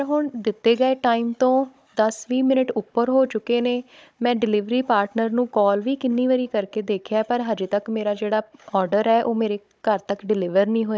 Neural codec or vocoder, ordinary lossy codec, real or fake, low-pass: codec, 16 kHz, 16 kbps, FunCodec, trained on Chinese and English, 50 frames a second; none; fake; none